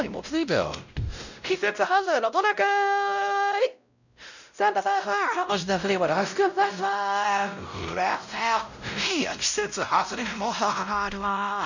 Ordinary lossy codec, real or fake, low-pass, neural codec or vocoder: none; fake; 7.2 kHz; codec, 16 kHz, 0.5 kbps, X-Codec, WavLM features, trained on Multilingual LibriSpeech